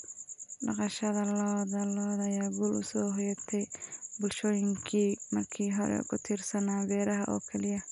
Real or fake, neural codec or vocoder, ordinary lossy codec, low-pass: real; none; none; 10.8 kHz